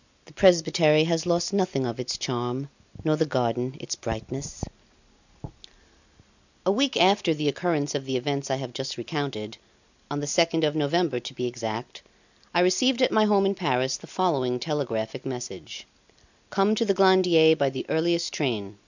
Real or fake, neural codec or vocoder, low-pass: real; none; 7.2 kHz